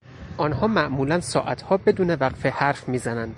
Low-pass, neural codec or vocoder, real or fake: 10.8 kHz; none; real